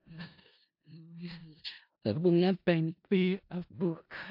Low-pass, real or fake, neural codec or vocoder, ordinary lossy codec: 5.4 kHz; fake; codec, 16 kHz in and 24 kHz out, 0.4 kbps, LongCat-Audio-Codec, four codebook decoder; AAC, 48 kbps